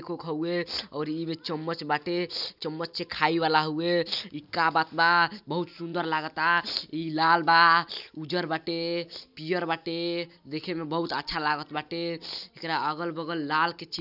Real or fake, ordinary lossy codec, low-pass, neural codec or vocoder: real; none; 5.4 kHz; none